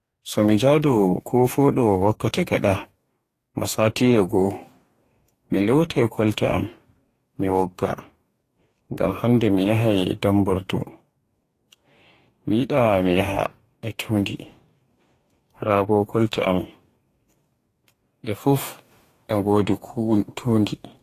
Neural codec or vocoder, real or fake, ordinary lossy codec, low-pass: codec, 44.1 kHz, 2.6 kbps, DAC; fake; AAC, 48 kbps; 14.4 kHz